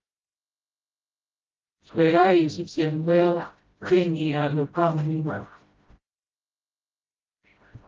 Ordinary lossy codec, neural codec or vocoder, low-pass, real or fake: Opus, 24 kbps; codec, 16 kHz, 0.5 kbps, FreqCodec, smaller model; 7.2 kHz; fake